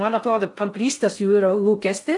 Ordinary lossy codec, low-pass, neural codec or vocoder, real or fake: AAC, 64 kbps; 10.8 kHz; codec, 16 kHz in and 24 kHz out, 0.6 kbps, FocalCodec, streaming, 4096 codes; fake